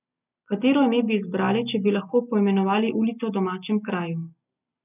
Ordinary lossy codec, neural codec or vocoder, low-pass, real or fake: none; none; 3.6 kHz; real